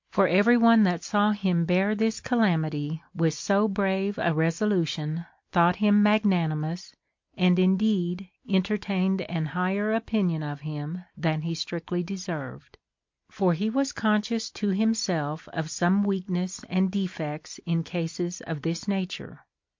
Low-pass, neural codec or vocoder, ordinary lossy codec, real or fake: 7.2 kHz; none; MP3, 48 kbps; real